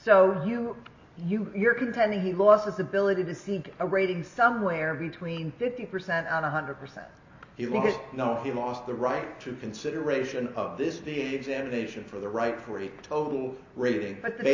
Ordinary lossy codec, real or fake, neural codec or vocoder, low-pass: MP3, 32 kbps; real; none; 7.2 kHz